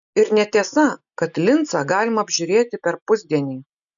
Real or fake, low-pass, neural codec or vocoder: real; 7.2 kHz; none